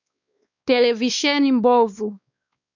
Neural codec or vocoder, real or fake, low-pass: codec, 16 kHz, 2 kbps, X-Codec, WavLM features, trained on Multilingual LibriSpeech; fake; 7.2 kHz